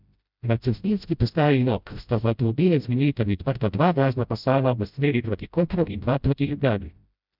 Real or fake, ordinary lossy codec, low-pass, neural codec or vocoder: fake; none; 5.4 kHz; codec, 16 kHz, 0.5 kbps, FreqCodec, smaller model